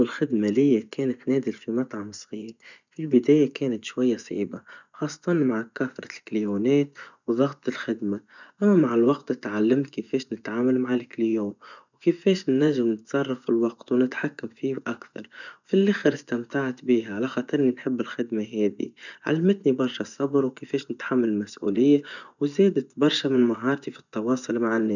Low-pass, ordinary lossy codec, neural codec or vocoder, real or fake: 7.2 kHz; none; vocoder, 24 kHz, 100 mel bands, Vocos; fake